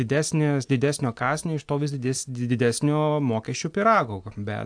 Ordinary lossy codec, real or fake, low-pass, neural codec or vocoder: AAC, 64 kbps; real; 9.9 kHz; none